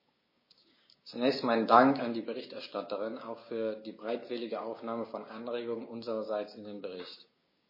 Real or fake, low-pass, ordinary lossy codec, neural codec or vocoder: fake; 5.4 kHz; MP3, 24 kbps; codec, 16 kHz, 6 kbps, DAC